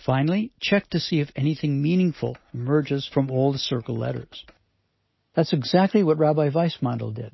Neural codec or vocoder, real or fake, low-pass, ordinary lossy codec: none; real; 7.2 kHz; MP3, 24 kbps